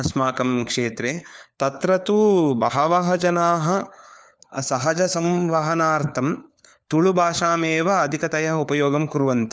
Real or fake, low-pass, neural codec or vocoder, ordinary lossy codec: fake; none; codec, 16 kHz, 4 kbps, FunCodec, trained on LibriTTS, 50 frames a second; none